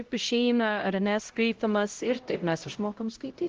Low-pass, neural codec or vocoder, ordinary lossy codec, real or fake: 7.2 kHz; codec, 16 kHz, 0.5 kbps, X-Codec, HuBERT features, trained on LibriSpeech; Opus, 16 kbps; fake